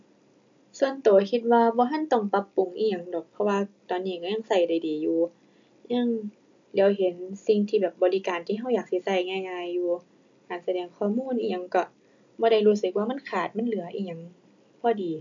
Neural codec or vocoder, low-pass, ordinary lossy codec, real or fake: none; 7.2 kHz; AAC, 64 kbps; real